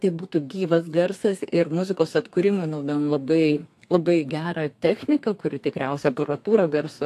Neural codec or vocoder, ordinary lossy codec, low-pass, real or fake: codec, 32 kHz, 1.9 kbps, SNAC; AAC, 64 kbps; 14.4 kHz; fake